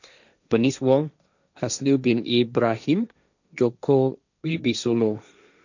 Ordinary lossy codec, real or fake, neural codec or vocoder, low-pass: none; fake; codec, 16 kHz, 1.1 kbps, Voila-Tokenizer; 7.2 kHz